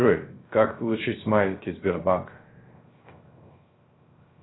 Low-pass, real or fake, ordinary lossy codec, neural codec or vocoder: 7.2 kHz; fake; AAC, 16 kbps; codec, 16 kHz, 0.3 kbps, FocalCodec